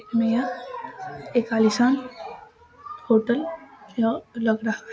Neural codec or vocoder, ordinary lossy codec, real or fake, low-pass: none; none; real; none